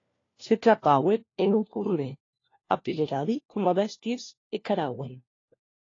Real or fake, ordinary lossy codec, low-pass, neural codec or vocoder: fake; AAC, 32 kbps; 7.2 kHz; codec, 16 kHz, 1 kbps, FunCodec, trained on LibriTTS, 50 frames a second